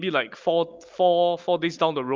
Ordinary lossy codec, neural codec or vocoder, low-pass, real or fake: Opus, 32 kbps; none; 7.2 kHz; real